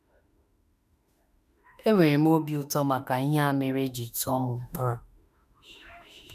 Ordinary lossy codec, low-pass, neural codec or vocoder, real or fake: none; 14.4 kHz; autoencoder, 48 kHz, 32 numbers a frame, DAC-VAE, trained on Japanese speech; fake